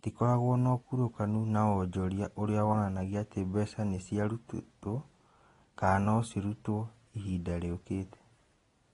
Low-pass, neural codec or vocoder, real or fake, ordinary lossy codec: 10.8 kHz; none; real; AAC, 32 kbps